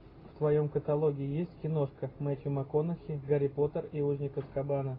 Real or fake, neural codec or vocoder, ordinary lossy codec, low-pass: real; none; AAC, 24 kbps; 5.4 kHz